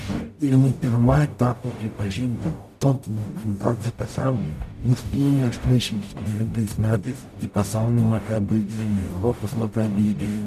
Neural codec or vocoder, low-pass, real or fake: codec, 44.1 kHz, 0.9 kbps, DAC; 14.4 kHz; fake